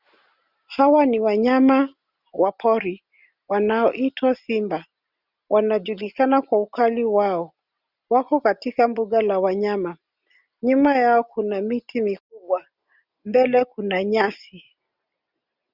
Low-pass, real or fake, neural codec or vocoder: 5.4 kHz; real; none